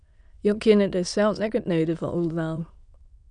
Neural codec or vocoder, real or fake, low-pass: autoencoder, 22.05 kHz, a latent of 192 numbers a frame, VITS, trained on many speakers; fake; 9.9 kHz